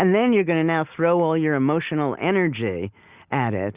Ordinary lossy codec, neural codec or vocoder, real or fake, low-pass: Opus, 64 kbps; none; real; 3.6 kHz